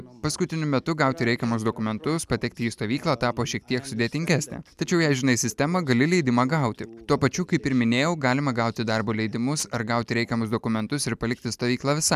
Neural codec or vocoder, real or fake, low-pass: none; real; 14.4 kHz